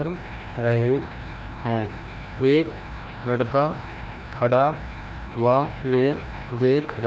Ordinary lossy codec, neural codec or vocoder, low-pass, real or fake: none; codec, 16 kHz, 1 kbps, FreqCodec, larger model; none; fake